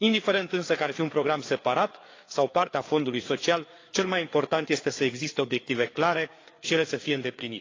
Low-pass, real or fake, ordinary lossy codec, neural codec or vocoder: 7.2 kHz; fake; AAC, 32 kbps; codec, 16 kHz, 4 kbps, FreqCodec, larger model